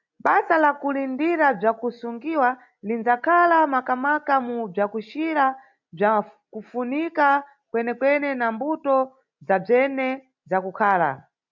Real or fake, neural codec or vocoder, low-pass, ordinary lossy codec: real; none; 7.2 kHz; MP3, 64 kbps